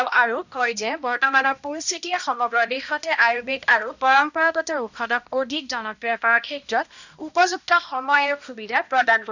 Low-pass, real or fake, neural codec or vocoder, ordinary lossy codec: 7.2 kHz; fake; codec, 16 kHz, 1 kbps, X-Codec, HuBERT features, trained on balanced general audio; none